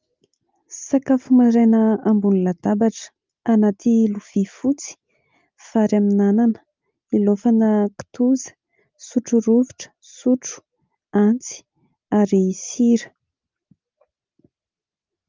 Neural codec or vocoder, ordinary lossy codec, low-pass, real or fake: none; Opus, 24 kbps; 7.2 kHz; real